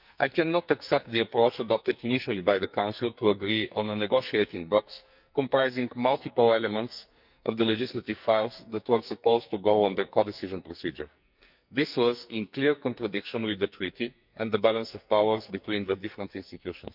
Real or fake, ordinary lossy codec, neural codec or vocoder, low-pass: fake; none; codec, 44.1 kHz, 2.6 kbps, SNAC; 5.4 kHz